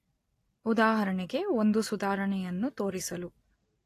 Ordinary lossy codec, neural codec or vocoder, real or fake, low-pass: AAC, 48 kbps; none; real; 14.4 kHz